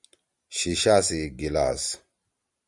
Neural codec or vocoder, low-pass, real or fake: vocoder, 44.1 kHz, 128 mel bands every 512 samples, BigVGAN v2; 10.8 kHz; fake